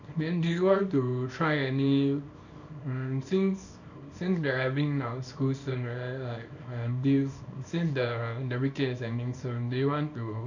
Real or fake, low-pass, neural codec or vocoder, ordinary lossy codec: fake; 7.2 kHz; codec, 24 kHz, 0.9 kbps, WavTokenizer, small release; none